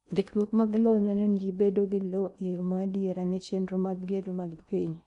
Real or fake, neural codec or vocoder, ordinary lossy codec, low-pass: fake; codec, 16 kHz in and 24 kHz out, 0.8 kbps, FocalCodec, streaming, 65536 codes; none; 10.8 kHz